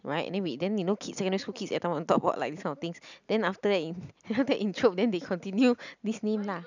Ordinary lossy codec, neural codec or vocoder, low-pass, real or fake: none; none; 7.2 kHz; real